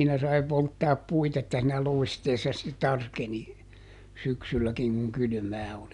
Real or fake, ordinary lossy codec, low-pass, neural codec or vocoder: real; none; 10.8 kHz; none